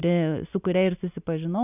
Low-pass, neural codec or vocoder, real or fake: 3.6 kHz; none; real